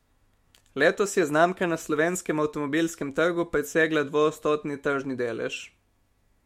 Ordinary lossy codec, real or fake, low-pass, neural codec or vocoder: MP3, 64 kbps; fake; 19.8 kHz; autoencoder, 48 kHz, 128 numbers a frame, DAC-VAE, trained on Japanese speech